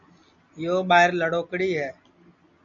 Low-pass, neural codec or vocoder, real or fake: 7.2 kHz; none; real